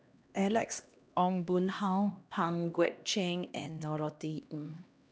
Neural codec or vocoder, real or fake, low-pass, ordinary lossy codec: codec, 16 kHz, 1 kbps, X-Codec, HuBERT features, trained on LibriSpeech; fake; none; none